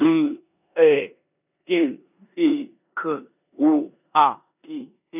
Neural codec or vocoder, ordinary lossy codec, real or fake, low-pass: codec, 16 kHz in and 24 kHz out, 0.9 kbps, LongCat-Audio-Codec, four codebook decoder; none; fake; 3.6 kHz